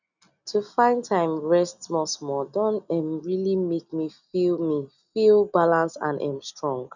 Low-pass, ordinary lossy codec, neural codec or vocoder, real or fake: 7.2 kHz; none; none; real